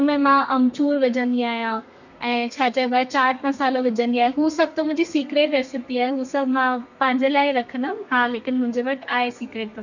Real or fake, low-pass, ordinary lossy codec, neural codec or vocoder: fake; 7.2 kHz; none; codec, 32 kHz, 1.9 kbps, SNAC